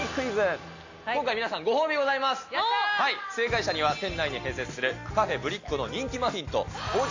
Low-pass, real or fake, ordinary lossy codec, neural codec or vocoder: 7.2 kHz; real; AAC, 48 kbps; none